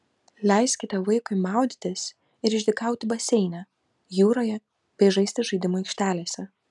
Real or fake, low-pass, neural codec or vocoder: real; 10.8 kHz; none